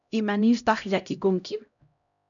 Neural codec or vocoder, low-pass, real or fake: codec, 16 kHz, 0.5 kbps, X-Codec, HuBERT features, trained on LibriSpeech; 7.2 kHz; fake